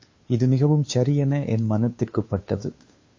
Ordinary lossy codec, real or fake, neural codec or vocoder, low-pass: MP3, 32 kbps; fake; codec, 16 kHz, 2 kbps, FunCodec, trained on Chinese and English, 25 frames a second; 7.2 kHz